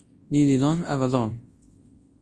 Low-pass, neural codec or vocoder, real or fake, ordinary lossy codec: 10.8 kHz; codec, 24 kHz, 0.9 kbps, WavTokenizer, large speech release; fake; Opus, 24 kbps